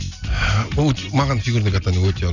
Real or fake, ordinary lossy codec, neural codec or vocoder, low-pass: real; none; none; 7.2 kHz